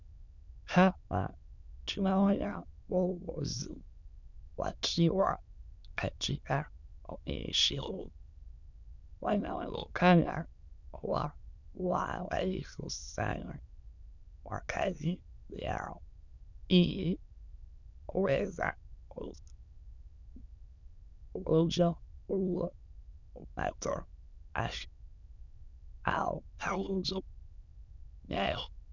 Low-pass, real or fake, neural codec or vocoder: 7.2 kHz; fake; autoencoder, 22.05 kHz, a latent of 192 numbers a frame, VITS, trained on many speakers